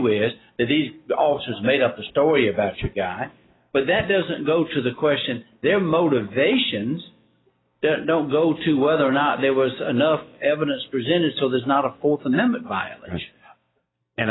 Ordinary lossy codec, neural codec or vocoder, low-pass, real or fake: AAC, 16 kbps; none; 7.2 kHz; real